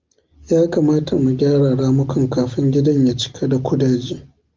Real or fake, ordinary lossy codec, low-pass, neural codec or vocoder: real; Opus, 24 kbps; 7.2 kHz; none